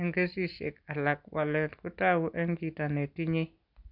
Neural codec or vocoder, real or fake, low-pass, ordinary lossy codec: none; real; 5.4 kHz; none